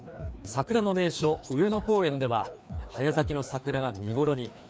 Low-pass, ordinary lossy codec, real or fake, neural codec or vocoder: none; none; fake; codec, 16 kHz, 2 kbps, FreqCodec, larger model